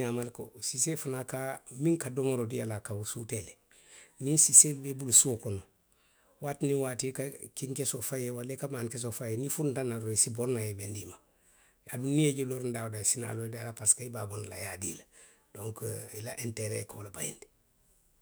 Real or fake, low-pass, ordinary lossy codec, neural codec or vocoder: fake; none; none; autoencoder, 48 kHz, 128 numbers a frame, DAC-VAE, trained on Japanese speech